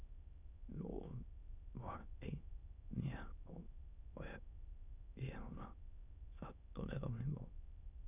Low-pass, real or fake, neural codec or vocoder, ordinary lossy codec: 3.6 kHz; fake; autoencoder, 22.05 kHz, a latent of 192 numbers a frame, VITS, trained on many speakers; none